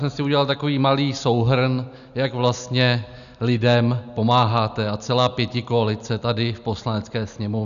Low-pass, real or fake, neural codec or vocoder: 7.2 kHz; real; none